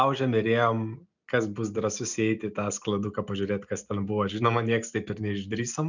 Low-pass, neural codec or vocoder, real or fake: 7.2 kHz; none; real